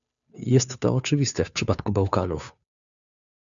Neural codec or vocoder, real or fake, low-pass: codec, 16 kHz, 2 kbps, FunCodec, trained on Chinese and English, 25 frames a second; fake; 7.2 kHz